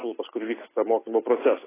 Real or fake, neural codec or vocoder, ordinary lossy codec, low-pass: real; none; AAC, 16 kbps; 3.6 kHz